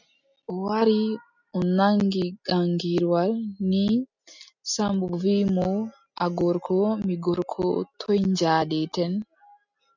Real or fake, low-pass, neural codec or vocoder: real; 7.2 kHz; none